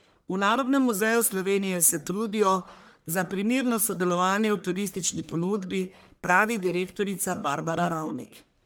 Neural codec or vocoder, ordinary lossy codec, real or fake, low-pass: codec, 44.1 kHz, 1.7 kbps, Pupu-Codec; none; fake; none